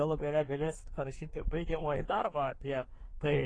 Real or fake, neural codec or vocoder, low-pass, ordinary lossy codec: fake; codec, 24 kHz, 1 kbps, SNAC; 9.9 kHz; AAC, 32 kbps